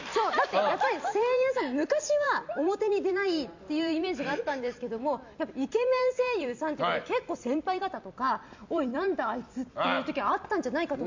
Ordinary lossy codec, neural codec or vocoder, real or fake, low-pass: MP3, 64 kbps; vocoder, 44.1 kHz, 128 mel bands every 256 samples, BigVGAN v2; fake; 7.2 kHz